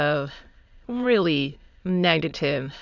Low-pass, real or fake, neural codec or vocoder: 7.2 kHz; fake; autoencoder, 22.05 kHz, a latent of 192 numbers a frame, VITS, trained on many speakers